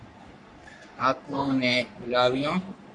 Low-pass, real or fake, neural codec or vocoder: 10.8 kHz; fake; codec, 44.1 kHz, 3.4 kbps, Pupu-Codec